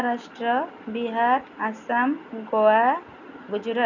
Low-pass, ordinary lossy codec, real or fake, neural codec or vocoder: 7.2 kHz; none; real; none